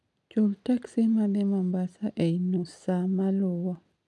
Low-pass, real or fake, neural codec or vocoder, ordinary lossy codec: none; real; none; none